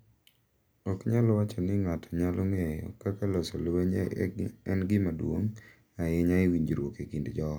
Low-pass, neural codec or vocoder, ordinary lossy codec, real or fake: none; none; none; real